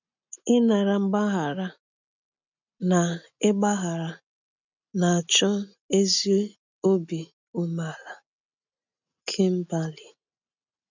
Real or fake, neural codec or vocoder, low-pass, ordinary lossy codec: real; none; 7.2 kHz; none